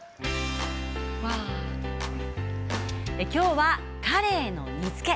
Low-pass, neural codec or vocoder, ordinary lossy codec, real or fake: none; none; none; real